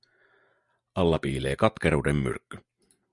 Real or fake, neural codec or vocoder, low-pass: real; none; 10.8 kHz